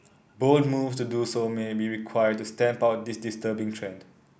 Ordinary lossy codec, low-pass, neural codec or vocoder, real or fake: none; none; none; real